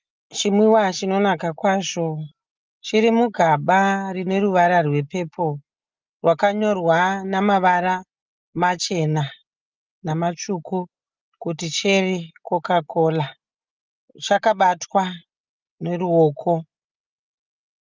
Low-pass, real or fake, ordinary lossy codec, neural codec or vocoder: 7.2 kHz; real; Opus, 24 kbps; none